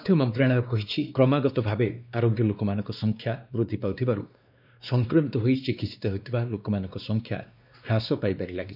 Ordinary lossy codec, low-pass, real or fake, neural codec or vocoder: none; 5.4 kHz; fake; codec, 16 kHz, 2 kbps, X-Codec, WavLM features, trained on Multilingual LibriSpeech